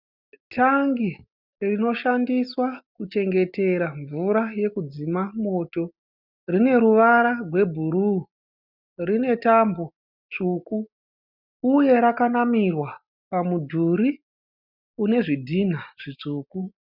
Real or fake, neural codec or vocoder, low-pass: real; none; 5.4 kHz